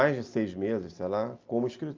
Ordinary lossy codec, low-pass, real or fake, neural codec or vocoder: Opus, 24 kbps; 7.2 kHz; real; none